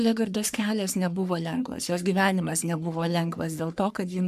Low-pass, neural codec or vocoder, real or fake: 14.4 kHz; codec, 44.1 kHz, 3.4 kbps, Pupu-Codec; fake